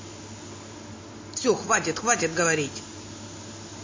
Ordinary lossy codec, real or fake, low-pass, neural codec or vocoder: MP3, 32 kbps; real; 7.2 kHz; none